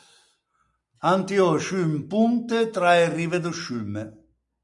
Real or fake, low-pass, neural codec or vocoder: real; 10.8 kHz; none